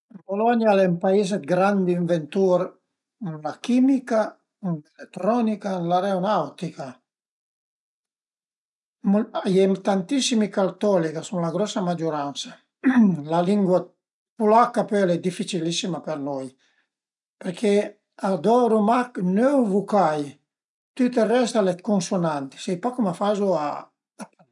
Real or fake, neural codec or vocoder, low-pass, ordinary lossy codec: real; none; 10.8 kHz; none